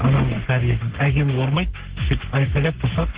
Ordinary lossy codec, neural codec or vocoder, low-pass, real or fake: Opus, 16 kbps; codec, 44.1 kHz, 3.4 kbps, Pupu-Codec; 3.6 kHz; fake